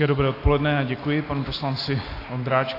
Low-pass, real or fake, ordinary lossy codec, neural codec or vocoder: 5.4 kHz; real; AAC, 32 kbps; none